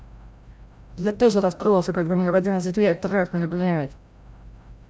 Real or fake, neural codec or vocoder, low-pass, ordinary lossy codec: fake; codec, 16 kHz, 0.5 kbps, FreqCodec, larger model; none; none